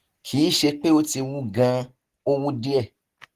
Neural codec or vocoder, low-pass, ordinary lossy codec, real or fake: none; 14.4 kHz; Opus, 16 kbps; real